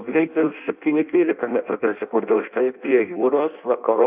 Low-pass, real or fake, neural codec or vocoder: 3.6 kHz; fake; codec, 16 kHz in and 24 kHz out, 0.6 kbps, FireRedTTS-2 codec